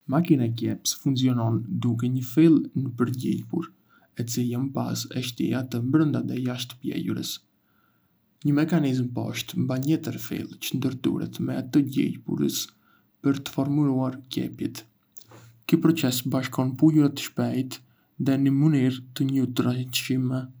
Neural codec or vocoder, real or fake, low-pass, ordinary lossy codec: none; real; none; none